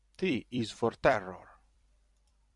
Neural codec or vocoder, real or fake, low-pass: vocoder, 44.1 kHz, 128 mel bands every 256 samples, BigVGAN v2; fake; 10.8 kHz